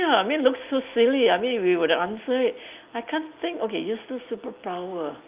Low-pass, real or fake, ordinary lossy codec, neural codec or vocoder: 3.6 kHz; real; Opus, 24 kbps; none